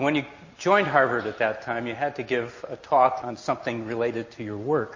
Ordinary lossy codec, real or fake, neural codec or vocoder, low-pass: MP3, 32 kbps; real; none; 7.2 kHz